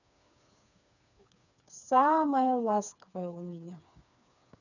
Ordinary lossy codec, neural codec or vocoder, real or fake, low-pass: none; codec, 16 kHz, 4 kbps, FreqCodec, smaller model; fake; 7.2 kHz